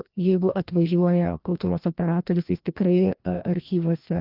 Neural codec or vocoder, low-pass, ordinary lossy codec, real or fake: codec, 16 kHz, 1 kbps, FreqCodec, larger model; 5.4 kHz; Opus, 24 kbps; fake